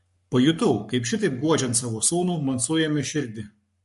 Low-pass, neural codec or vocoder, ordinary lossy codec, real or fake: 14.4 kHz; codec, 44.1 kHz, 7.8 kbps, Pupu-Codec; MP3, 48 kbps; fake